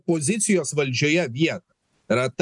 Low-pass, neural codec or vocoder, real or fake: 10.8 kHz; none; real